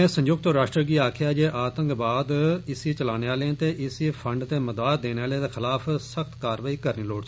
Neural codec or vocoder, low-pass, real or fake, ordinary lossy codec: none; none; real; none